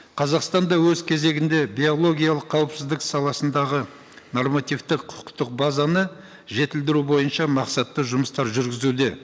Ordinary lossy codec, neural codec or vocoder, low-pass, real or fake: none; none; none; real